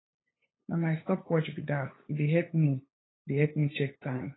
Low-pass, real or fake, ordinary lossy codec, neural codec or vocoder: 7.2 kHz; fake; AAC, 16 kbps; codec, 16 kHz, 8 kbps, FunCodec, trained on LibriTTS, 25 frames a second